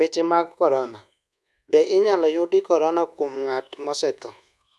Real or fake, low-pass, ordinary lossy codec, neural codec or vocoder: fake; none; none; codec, 24 kHz, 1.2 kbps, DualCodec